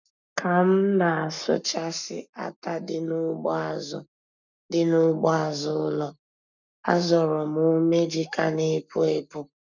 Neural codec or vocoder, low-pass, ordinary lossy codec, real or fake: codec, 44.1 kHz, 7.8 kbps, Pupu-Codec; 7.2 kHz; none; fake